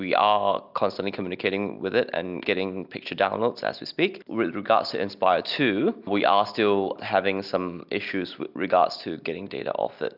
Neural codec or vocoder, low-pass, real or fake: none; 5.4 kHz; real